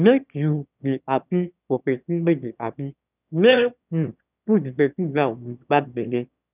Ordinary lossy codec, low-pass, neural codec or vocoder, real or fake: none; 3.6 kHz; autoencoder, 22.05 kHz, a latent of 192 numbers a frame, VITS, trained on one speaker; fake